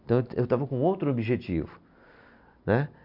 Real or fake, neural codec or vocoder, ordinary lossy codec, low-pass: real; none; none; 5.4 kHz